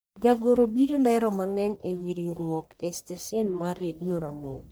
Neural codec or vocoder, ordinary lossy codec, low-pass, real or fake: codec, 44.1 kHz, 1.7 kbps, Pupu-Codec; none; none; fake